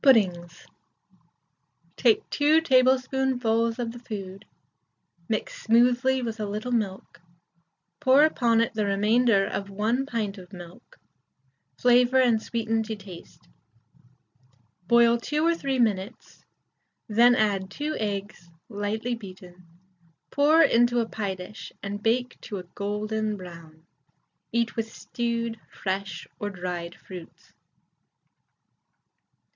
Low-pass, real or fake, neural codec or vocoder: 7.2 kHz; real; none